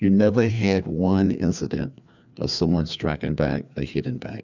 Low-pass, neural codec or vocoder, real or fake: 7.2 kHz; codec, 16 kHz, 2 kbps, FreqCodec, larger model; fake